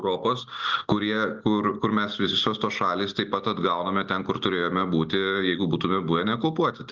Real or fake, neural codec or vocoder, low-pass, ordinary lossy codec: real; none; 7.2 kHz; Opus, 32 kbps